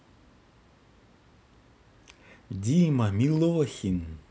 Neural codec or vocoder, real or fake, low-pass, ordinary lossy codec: none; real; none; none